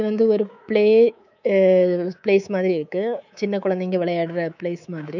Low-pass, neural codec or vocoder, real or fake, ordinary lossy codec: 7.2 kHz; codec, 24 kHz, 3.1 kbps, DualCodec; fake; none